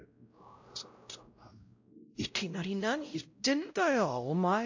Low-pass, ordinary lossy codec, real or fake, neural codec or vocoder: 7.2 kHz; AAC, 48 kbps; fake; codec, 16 kHz, 0.5 kbps, X-Codec, WavLM features, trained on Multilingual LibriSpeech